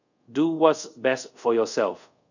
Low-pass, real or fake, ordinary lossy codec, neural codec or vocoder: 7.2 kHz; fake; none; codec, 24 kHz, 0.5 kbps, DualCodec